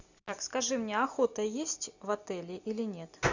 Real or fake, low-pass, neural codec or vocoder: real; 7.2 kHz; none